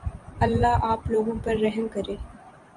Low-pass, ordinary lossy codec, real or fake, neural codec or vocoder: 10.8 kHz; MP3, 96 kbps; fake; vocoder, 44.1 kHz, 128 mel bands every 512 samples, BigVGAN v2